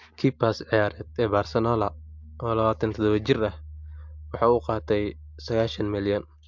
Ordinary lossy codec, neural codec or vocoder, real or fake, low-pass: MP3, 64 kbps; none; real; 7.2 kHz